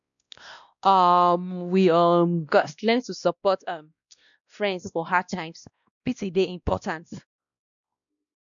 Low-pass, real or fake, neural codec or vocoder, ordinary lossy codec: 7.2 kHz; fake; codec, 16 kHz, 1 kbps, X-Codec, WavLM features, trained on Multilingual LibriSpeech; none